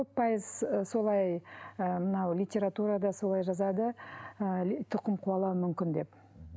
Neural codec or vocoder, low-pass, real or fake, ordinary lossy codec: none; none; real; none